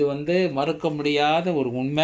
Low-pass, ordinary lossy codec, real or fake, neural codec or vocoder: none; none; real; none